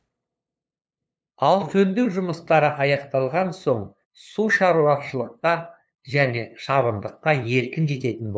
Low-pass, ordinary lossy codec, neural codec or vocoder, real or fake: none; none; codec, 16 kHz, 2 kbps, FunCodec, trained on LibriTTS, 25 frames a second; fake